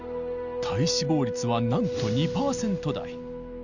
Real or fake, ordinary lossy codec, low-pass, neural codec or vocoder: real; none; 7.2 kHz; none